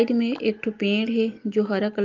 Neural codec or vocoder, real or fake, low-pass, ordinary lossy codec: none; real; 7.2 kHz; Opus, 32 kbps